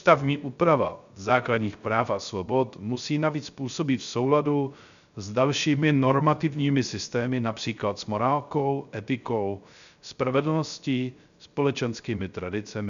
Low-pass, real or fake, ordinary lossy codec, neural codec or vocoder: 7.2 kHz; fake; MP3, 96 kbps; codec, 16 kHz, 0.3 kbps, FocalCodec